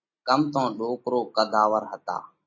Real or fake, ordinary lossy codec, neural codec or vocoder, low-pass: real; MP3, 32 kbps; none; 7.2 kHz